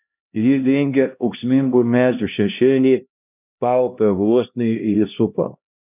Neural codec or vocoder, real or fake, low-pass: codec, 16 kHz, 1 kbps, X-Codec, HuBERT features, trained on LibriSpeech; fake; 3.6 kHz